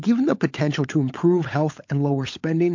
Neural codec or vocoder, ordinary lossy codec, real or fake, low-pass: codec, 16 kHz, 16 kbps, FunCodec, trained on LibriTTS, 50 frames a second; MP3, 48 kbps; fake; 7.2 kHz